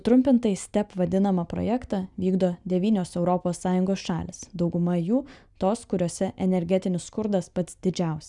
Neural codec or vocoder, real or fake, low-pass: none; real; 10.8 kHz